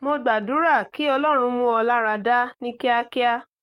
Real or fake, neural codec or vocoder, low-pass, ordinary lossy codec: fake; codec, 44.1 kHz, 7.8 kbps, DAC; 19.8 kHz; MP3, 64 kbps